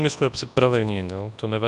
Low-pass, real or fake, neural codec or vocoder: 10.8 kHz; fake; codec, 24 kHz, 0.9 kbps, WavTokenizer, large speech release